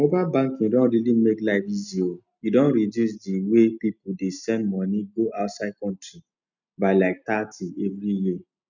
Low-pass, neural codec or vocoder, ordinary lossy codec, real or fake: 7.2 kHz; none; none; real